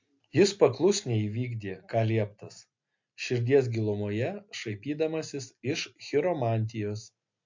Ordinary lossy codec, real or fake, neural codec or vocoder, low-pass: MP3, 48 kbps; real; none; 7.2 kHz